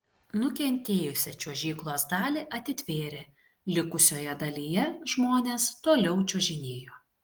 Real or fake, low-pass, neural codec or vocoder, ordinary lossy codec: real; 19.8 kHz; none; Opus, 24 kbps